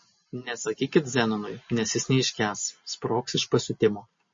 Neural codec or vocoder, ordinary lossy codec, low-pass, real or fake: none; MP3, 32 kbps; 7.2 kHz; real